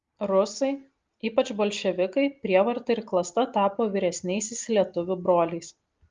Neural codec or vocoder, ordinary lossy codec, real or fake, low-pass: none; Opus, 32 kbps; real; 7.2 kHz